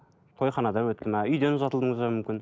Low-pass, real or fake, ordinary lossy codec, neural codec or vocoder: none; real; none; none